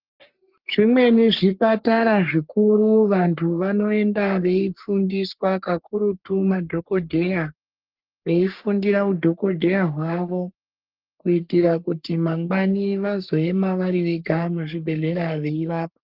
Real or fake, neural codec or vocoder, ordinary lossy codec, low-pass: fake; codec, 44.1 kHz, 3.4 kbps, Pupu-Codec; Opus, 24 kbps; 5.4 kHz